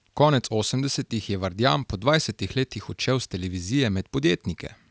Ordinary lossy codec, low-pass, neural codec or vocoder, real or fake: none; none; none; real